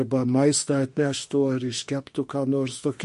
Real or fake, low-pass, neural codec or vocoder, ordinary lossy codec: fake; 14.4 kHz; autoencoder, 48 kHz, 32 numbers a frame, DAC-VAE, trained on Japanese speech; MP3, 48 kbps